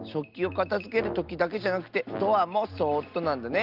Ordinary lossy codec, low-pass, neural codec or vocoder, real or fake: Opus, 32 kbps; 5.4 kHz; none; real